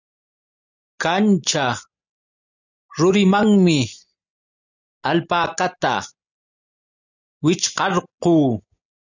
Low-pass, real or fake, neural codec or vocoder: 7.2 kHz; real; none